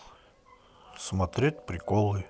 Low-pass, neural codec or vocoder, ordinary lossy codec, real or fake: none; none; none; real